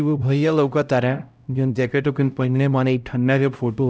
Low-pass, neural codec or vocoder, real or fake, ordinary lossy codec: none; codec, 16 kHz, 0.5 kbps, X-Codec, HuBERT features, trained on LibriSpeech; fake; none